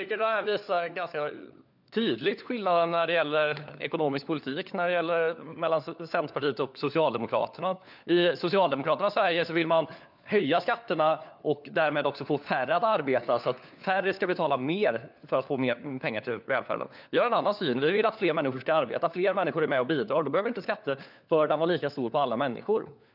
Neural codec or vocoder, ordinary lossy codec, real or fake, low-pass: codec, 16 kHz, 4 kbps, FunCodec, trained on LibriTTS, 50 frames a second; none; fake; 5.4 kHz